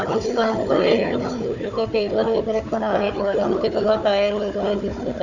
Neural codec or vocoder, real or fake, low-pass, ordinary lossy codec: codec, 16 kHz, 4 kbps, FunCodec, trained on Chinese and English, 50 frames a second; fake; 7.2 kHz; none